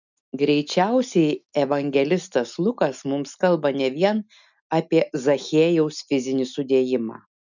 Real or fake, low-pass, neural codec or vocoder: real; 7.2 kHz; none